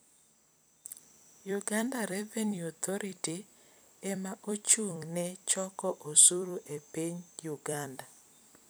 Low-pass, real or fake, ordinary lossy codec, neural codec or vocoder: none; fake; none; vocoder, 44.1 kHz, 128 mel bands every 256 samples, BigVGAN v2